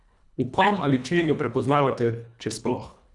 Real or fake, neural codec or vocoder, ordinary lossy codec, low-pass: fake; codec, 24 kHz, 1.5 kbps, HILCodec; none; 10.8 kHz